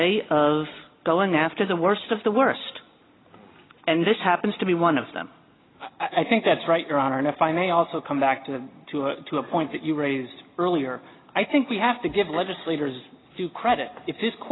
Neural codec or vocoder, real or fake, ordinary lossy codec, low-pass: none; real; AAC, 16 kbps; 7.2 kHz